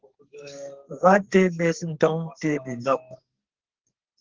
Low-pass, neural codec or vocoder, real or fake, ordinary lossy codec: 7.2 kHz; codec, 44.1 kHz, 2.6 kbps, SNAC; fake; Opus, 16 kbps